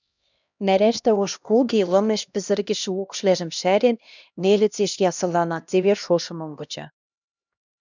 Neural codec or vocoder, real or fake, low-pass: codec, 16 kHz, 1 kbps, X-Codec, HuBERT features, trained on LibriSpeech; fake; 7.2 kHz